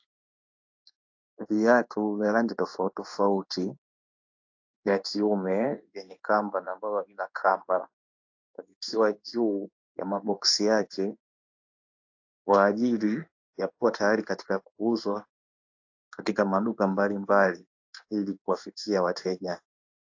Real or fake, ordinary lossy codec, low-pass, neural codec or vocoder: fake; AAC, 48 kbps; 7.2 kHz; codec, 16 kHz in and 24 kHz out, 1 kbps, XY-Tokenizer